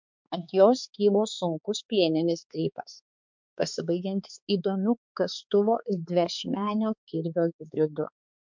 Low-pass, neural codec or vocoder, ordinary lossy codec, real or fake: 7.2 kHz; codec, 16 kHz, 4 kbps, X-Codec, HuBERT features, trained on balanced general audio; MP3, 64 kbps; fake